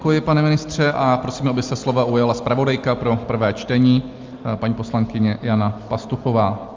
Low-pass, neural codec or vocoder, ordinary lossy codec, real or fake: 7.2 kHz; none; Opus, 32 kbps; real